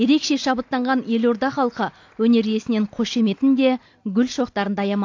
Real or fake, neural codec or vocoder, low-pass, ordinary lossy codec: real; none; 7.2 kHz; AAC, 48 kbps